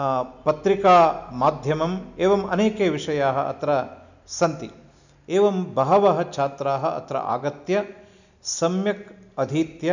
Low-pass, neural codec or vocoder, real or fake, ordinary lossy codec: 7.2 kHz; none; real; none